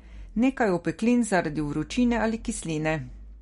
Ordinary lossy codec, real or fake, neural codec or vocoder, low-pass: MP3, 48 kbps; real; none; 10.8 kHz